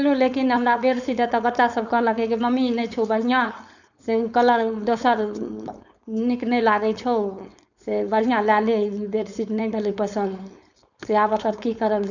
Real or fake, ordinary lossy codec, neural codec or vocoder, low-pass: fake; none; codec, 16 kHz, 4.8 kbps, FACodec; 7.2 kHz